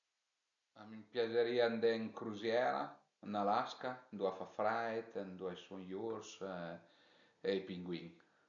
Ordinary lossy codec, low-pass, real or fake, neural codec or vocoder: none; 7.2 kHz; real; none